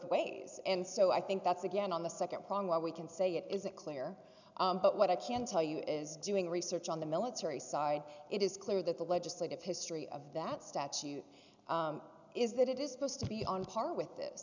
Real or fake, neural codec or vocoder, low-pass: real; none; 7.2 kHz